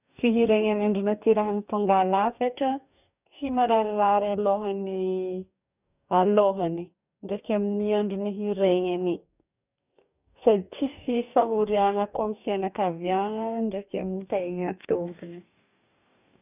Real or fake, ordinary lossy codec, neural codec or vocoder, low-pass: fake; none; codec, 44.1 kHz, 2.6 kbps, DAC; 3.6 kHz